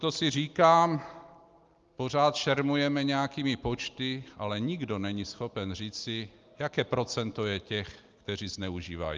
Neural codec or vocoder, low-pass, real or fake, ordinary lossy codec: none; 7.2 kHz; real; Opus, 32 kbps